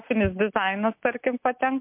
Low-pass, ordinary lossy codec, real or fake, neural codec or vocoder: 3.6 kHz; MP3, 32 kbps; real; none